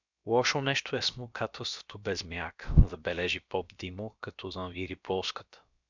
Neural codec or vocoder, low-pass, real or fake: codec, 16 kHz, about 1 kbps, DyCAST, with the encoder's durations; 7.2 kHz; fake